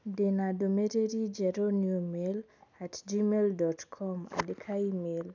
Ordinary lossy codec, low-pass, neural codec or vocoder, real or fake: none; 7.2 kHz; none; real